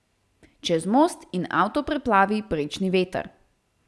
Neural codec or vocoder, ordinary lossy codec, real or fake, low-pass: none; none; real; none